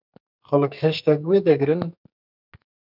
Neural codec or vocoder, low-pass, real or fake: codec, 44.1 kHz, 2.6 kbps, SNAC; 5.4 kHz; fake